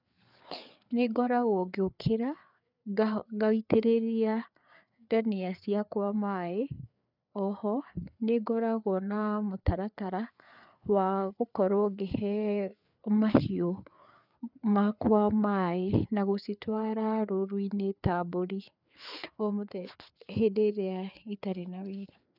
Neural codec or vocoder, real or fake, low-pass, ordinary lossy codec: codec, 16 kHz, 4 kbps, FreqCodec, larger model; fake; 5.4 kHz; none